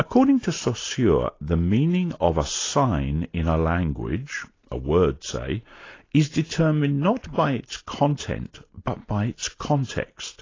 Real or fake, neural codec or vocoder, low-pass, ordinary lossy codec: real; none; 7.2 kHz; AAC, 32 kbps